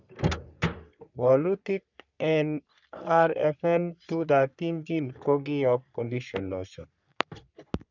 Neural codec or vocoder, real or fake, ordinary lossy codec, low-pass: codec, 44.1 kHz, 3.4 kbps, Pupu-Codec; fake; none; 7.2 kHz